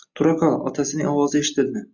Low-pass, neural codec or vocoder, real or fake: 7.2 kHz; none; real